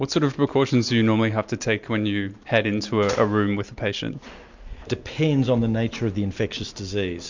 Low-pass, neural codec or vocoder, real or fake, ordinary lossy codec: 7.2 kHz; none; real; MP3, 64 kbps